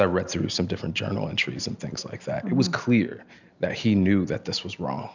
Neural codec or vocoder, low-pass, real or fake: none; 7.2 kHz; real